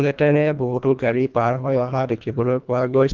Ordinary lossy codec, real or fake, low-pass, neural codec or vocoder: Opus, 24 kbps; fake; 7.2 kHz; codec, 24 kHz, 1.5 kbps, HILCodec